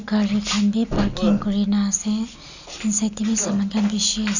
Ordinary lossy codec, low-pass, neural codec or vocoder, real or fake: none; 7.2 kHz; none; real